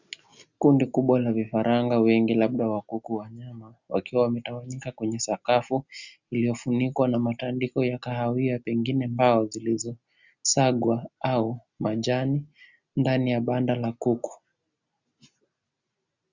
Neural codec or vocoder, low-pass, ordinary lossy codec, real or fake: none; 7.2 kHz; Opus, 64 kbps; real